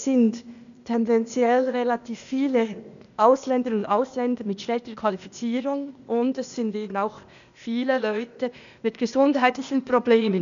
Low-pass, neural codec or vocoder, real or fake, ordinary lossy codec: 7.2 kHz; codec, 16 kHz, 0.8 kbps, ZipCodec; fake; none